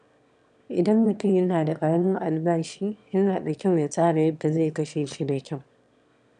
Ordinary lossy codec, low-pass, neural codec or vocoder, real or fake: none; 9.9 kHz; autoencoder, 22.05 kHz, a latent of 192 numbers a frame, VITS, trained on one speaker; fake